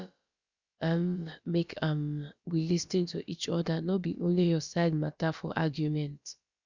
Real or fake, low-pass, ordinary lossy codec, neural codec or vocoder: fake; 7.2 kHz; Opus, 64 kbps; codec, 16 kHz, about 1 kbps, DyCAST, with the encoder's durations